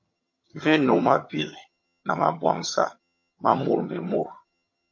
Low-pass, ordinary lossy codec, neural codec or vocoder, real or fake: 7.2 kHz; MP3, 48 kbps; vocoder, 22.05 kHz, 80 mel bands, HiFi-GAN; fake